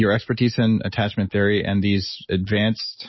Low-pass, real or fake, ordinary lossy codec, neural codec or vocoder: 7.2 kHz; real; MP3, 24 kbps; none